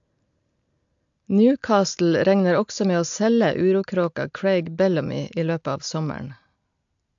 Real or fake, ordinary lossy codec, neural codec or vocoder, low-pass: real; AAC, 64 kbps; none; 7.2 kHz